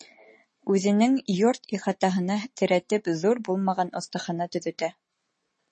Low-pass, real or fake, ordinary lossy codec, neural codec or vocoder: 10.8 kHz; real; MP3, 32 kbps; none